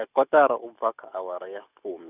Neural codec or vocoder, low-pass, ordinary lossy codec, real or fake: none; 3.6 kHz; none; real